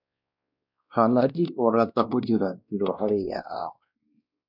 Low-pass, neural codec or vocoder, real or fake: 5.4 kHz; codec, 16 kHz, 1 kbps, X-Codec, WavLM features, trained on Multilingual LibriSpeech; fake